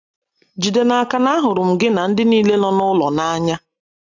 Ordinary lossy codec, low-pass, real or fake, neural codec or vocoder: AAC, 48 kbps; 7.2 kHz; real; none